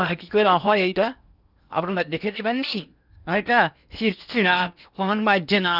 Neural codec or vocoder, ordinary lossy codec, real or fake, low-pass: codec, 16 kHz in and 24 kHz out, 0.8 kbps, FocalCodec, streaming, 65536 codes; none; fake; 5.4 kHz